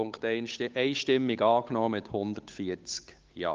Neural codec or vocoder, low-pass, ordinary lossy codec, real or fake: codec, 16 kHz, 8 kbps, FunCodec, trained on Chinese and English, 25 frames a second; 7.2 kHz; Opus, 32 kbps; fake